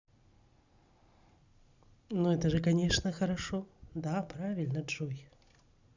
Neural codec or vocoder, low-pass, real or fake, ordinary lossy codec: none; 7.2 kHz; real; Opus, 64 kbps